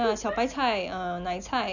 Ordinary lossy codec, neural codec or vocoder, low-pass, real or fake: none; none; 7.2 kHz; real